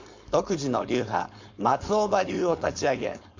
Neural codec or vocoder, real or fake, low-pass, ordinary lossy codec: codec, 16 kHz, 4.8 kbps, FACodec; fake; 7.2 kHz; MP3, 48 kbps